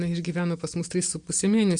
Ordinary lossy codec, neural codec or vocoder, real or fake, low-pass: MP3, 64 kbps; none; real; 9.9 kHz